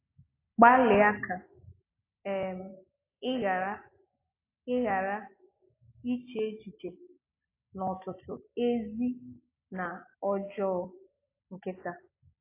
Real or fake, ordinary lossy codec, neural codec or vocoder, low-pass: real; AAC, 24 kbps; none; 3.6 kHz